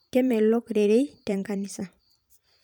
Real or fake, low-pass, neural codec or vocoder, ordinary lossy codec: fake; 19.8 kHz; vocoder, 44.1 kHz, 128 mel bands every 512 samples, BigVGAN v2; none